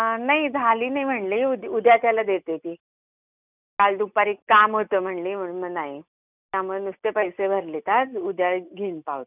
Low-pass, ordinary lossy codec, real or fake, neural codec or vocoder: 3.6 kHz; none; real; none